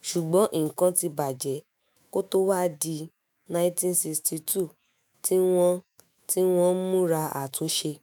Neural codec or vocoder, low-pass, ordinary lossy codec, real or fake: autoencoder, 48 kHz, 128 numbers a frame, DAC-VAE, trained on Japanese speech; none; none; fake